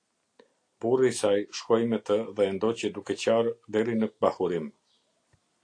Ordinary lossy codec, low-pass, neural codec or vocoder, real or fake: AAC, 48 kbps; 9.9 kHz; none; real